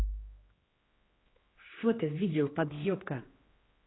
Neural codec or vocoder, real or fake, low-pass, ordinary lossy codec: codec, 16 kHz, 2 kbps, X-Codec, HuBERT features, trained on general audio; fake; 7.2 kHz; AAC, 16 kbps